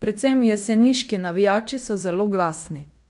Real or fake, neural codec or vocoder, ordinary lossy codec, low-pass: fake; codec, 16 kHz in and 24 kHz out, 0.9 kbps, LongCat-Audio-Codec, fine tuned four codebook decoder; none; 10.8 kHz